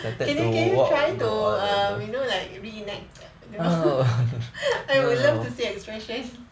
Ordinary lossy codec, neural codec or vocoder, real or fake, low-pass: none; none; real; none